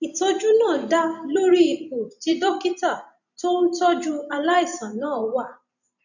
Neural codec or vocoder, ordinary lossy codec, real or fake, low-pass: vocoder, 44.1 kHz, 128 mel bands every 512 samples, BigVGAN v2; none; fake; 7.2 kHz